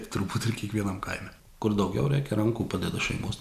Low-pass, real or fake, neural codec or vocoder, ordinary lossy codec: 14.4 kHz; real; none; MP3, 96 kbps